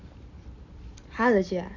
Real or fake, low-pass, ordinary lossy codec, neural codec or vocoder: real; 7.2 kHz; none; none